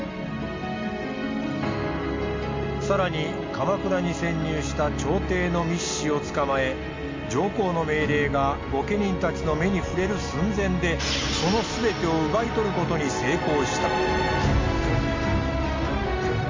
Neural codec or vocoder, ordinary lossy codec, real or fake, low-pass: none; MP3, 48 kbps; real; 7.2 kHz